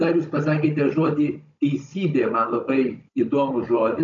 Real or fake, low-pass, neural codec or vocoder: fake; 7.2 kHz; codec, 16 kHz, 16 kbps, FunCodec, trained on Chinese and English, 50 frames a second